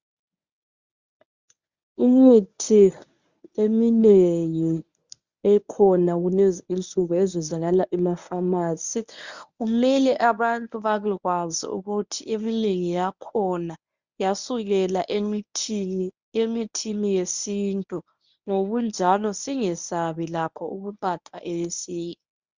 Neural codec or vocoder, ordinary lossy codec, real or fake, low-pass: codec, 24 kHz, 0.9 kbps, WavTokenizer, medium speech release version 1; Opus, 64 kbps; fake; 7.2 kHz